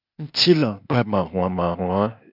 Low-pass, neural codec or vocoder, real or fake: 5.4 kHz; codec, 16 kHz, 0.8 kbps, ZipCodec; fake